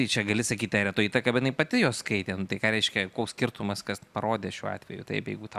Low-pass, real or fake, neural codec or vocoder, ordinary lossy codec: 14.4 kHz; real; none; Opus, 64 kbps